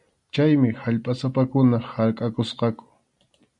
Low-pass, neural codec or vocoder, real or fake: 10.8 kHz; none; real